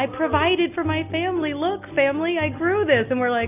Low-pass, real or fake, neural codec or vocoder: 3.6 kHz; real; none